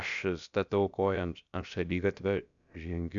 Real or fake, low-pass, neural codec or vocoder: fake; 7.2 kHz; codec, 16 kHz, about 1 kbps, DyCAST, with the encoder's durations